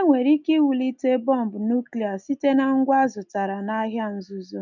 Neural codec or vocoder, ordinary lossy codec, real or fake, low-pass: none; none; real; 7.2 kHz